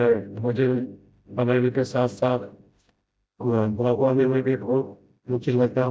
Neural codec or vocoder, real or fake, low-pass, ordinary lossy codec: codec, 16 kHz, 0.5 kbps, FreqCodec, smaller model; fake; none; none